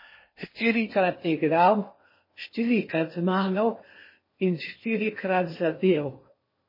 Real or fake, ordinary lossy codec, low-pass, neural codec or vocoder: fake; MP3, 24 kbps; 5.4 kHz; codec, 16 kHz in and 24 kHz out, 0.8 kbps, FocalCodec, streaming, 65536 codes